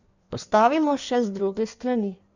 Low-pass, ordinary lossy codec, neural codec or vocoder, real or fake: 7.2 kHz; none; codec, 16 kHz in and 24 kHz out, 1.1 kbps, FireRedTTS-2 codec; fake